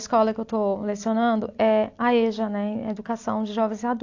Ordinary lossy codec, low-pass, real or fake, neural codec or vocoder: AAC, 48 kbps; 7.2 kHz; real; none